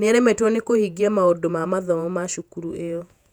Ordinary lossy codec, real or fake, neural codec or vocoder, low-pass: none; fake; vocoder, 48 kHz, 128 mel bands, Vocos; 19.8 kHz